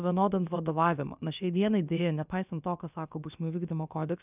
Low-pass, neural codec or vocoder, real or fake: 3.6 kHz; codec, 16 kHz, about 1 kbps, DyCAST, with the encoder's durations; fake